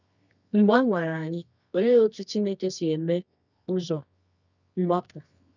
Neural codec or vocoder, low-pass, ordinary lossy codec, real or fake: codec, 24 kHz, 0.9 kbps, WavTokenizer, medium music audio release; 7.2 kHz; none; fake